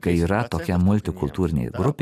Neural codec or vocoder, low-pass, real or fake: none; 14.4 kHz; real